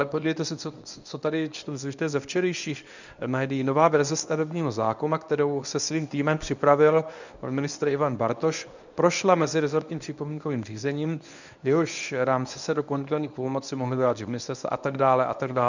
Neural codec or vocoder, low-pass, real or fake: codec, 24 kHz, 0.9 kbps, WavTokenizer, medium speech release version 1; 7.2 kHz; fake